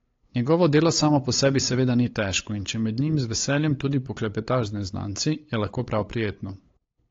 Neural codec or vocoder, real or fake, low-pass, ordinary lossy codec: codec, 16 kHz, 8 kbps, FunCodec, trained on LibriTTS, 25 frames a second; fake; 7.2 kHz; AAC, 32 kbps